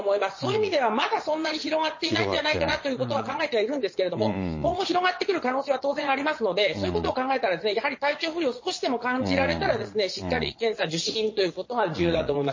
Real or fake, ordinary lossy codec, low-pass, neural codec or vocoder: fake; MP3, 32 kbps; 7.2 kHz; vocoder, 22.05 kHz, 80 mel bands, Vocos